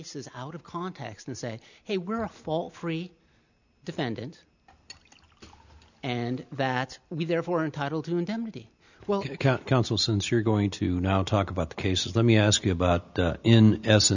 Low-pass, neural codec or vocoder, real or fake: 7.2 kHz; none; real